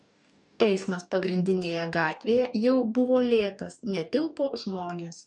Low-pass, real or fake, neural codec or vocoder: 10.8 kHz; fake; codec, 44.1 kHz, 2.6 kbps, DAC